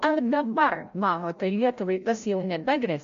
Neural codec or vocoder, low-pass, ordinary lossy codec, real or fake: codec, 16 kHz, 0.5 kbps, FreqCodec, larger model; 7.2 kHz; MP3, 48 kbps; fake